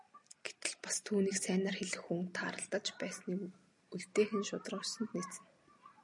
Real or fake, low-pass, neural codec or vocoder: real; 10.8 kHz; none